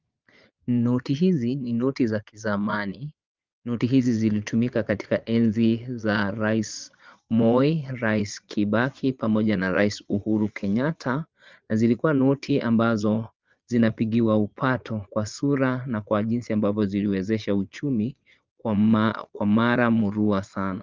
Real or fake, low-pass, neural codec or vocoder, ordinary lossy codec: fake; 7.2 kHz; vocoder, 22.05 kHz, 80 mel bands, Vocos; Opus, 32 kbps